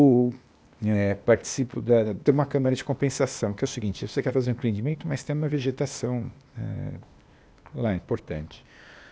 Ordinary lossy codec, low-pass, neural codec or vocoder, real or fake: none; none; codec, 16 kHz, 0.8 kbps, ZipCodec; fake